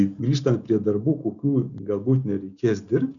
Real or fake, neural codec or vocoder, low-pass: real; none; 7.2 kHz